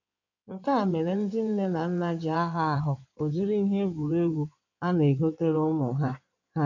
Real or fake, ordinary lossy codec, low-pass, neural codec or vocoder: fake; none; 7.2 kHz; codec, 16 kHz in and 24 kHz out, 2.2 kbps, FireRedTTS-2 codec